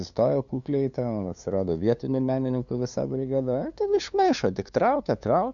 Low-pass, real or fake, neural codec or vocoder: 7.2 kHz; fake; codec, 16 kHz, 2 kbps, FunCodec, trained on LibriTTS, 25 frames a second